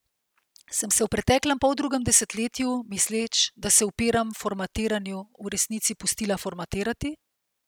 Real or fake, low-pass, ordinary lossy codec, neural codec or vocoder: real; none; none; none